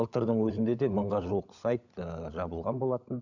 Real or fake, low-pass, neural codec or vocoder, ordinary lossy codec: fake; 7.2 kHz; codec, 16 kHz, 16 kbps, FunCodec, trained on LibriTTS, 50 frames a second; none